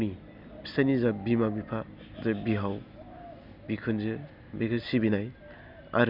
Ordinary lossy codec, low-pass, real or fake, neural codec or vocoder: none; 5.4 kHz; real; none